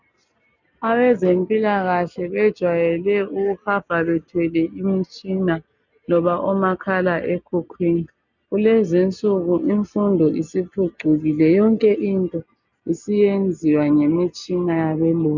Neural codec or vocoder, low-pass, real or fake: none; 7.2 kHz; real